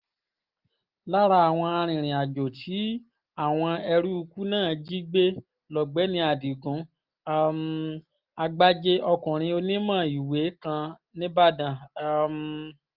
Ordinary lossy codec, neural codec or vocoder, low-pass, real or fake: Opus, 24 kbps; none; 5.4 kHz; real